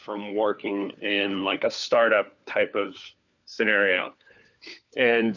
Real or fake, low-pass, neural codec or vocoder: fake; 7.2 kHz; codec, 16 kHz, 4 kbps, FunCodec, trained on LibriTTS, 50 frames a second